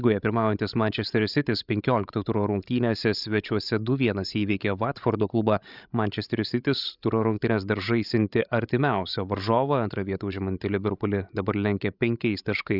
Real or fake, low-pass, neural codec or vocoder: fake; 5.4 kHz; codec, 16 kHz, 16 kbps, FreqCodec, larger model